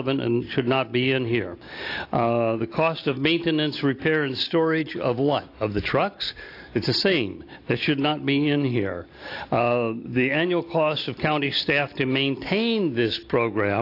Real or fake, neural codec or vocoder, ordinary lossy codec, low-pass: real; none; AAC, 32 kbps; 5.4 kHz